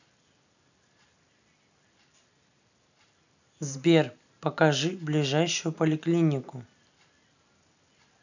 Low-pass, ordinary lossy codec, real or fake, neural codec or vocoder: 7.2 kHz; none; real; none